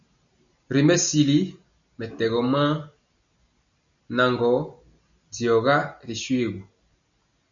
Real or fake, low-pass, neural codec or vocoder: real; 7.2 kHz; none